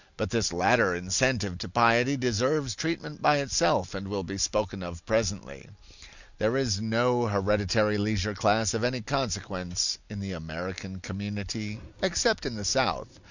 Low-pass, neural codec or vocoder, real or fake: 7.2 kHz; none; real